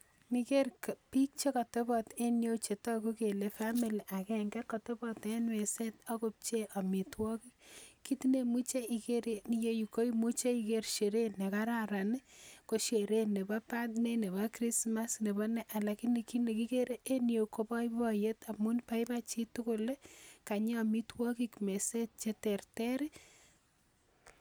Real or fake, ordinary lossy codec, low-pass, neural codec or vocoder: real; none; none; none